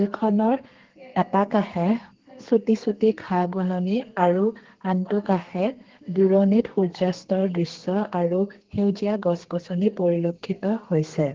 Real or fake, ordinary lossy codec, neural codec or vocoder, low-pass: fake; Opus, 16 kbps; codec, 32 kHz, 1.9 kbps, SNAC; 7.2 kHz